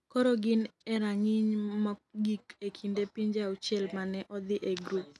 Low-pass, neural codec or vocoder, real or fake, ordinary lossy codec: none; none; real; none